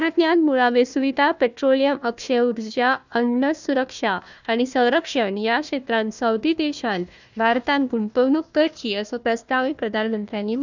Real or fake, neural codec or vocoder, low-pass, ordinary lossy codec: fake; codec, 16 kHz, 1 kbps, FunCodec, trained on Chinese and English, 50 frames a second; 7.2 kHz; none